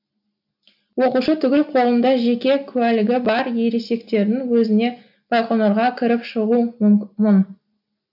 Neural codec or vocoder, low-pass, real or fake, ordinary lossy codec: none; 5.4 kHz; real; none